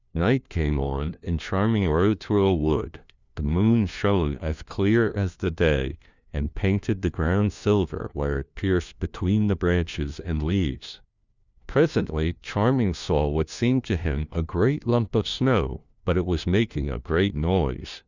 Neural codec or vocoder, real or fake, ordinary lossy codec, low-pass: codec, 16 kHz, 1 kbps, FunCodec, trained on LibriTTS, 50 frames a second; fake; Opus, 64 kbps; 7.2 kHz